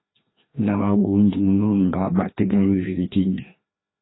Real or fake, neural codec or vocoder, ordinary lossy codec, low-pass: fake; codec, 16 kHz, 1 kbps, FreqCodec, larger model; AAC, 16 kbps; 7.2 kHz